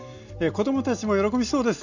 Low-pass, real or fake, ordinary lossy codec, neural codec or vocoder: 7.2 kHz; real; none; none